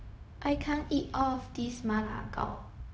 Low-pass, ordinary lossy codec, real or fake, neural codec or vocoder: none; none; fake; codec, 16 kHz, 0.4 kbps, LongCat-Audio-Codec